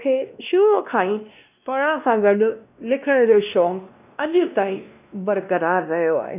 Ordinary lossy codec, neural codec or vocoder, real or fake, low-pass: none; codec, 16 kHz, 1 kbps, X-Codec, WavLM features, trained on Multilingual LibriSpeech; fake; 3.6 kHz